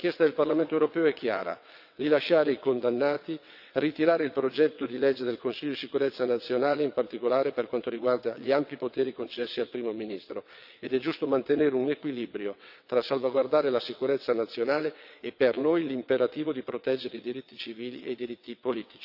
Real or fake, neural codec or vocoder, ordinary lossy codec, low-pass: fake; vocoder, 22.05 kHz, 80 mel bands, WaveNeXt; none; 5.4 kHz